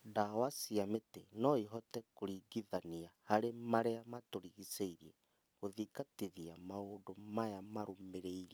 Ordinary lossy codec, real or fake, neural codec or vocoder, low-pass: none; real; none; none